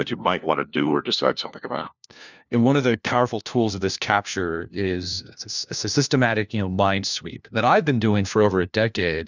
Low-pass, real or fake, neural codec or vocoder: 7.2 kHz; fake; codec, 16 kHz, 1 kbps, FunCodec, trained on LibriTTS, 50 frames a second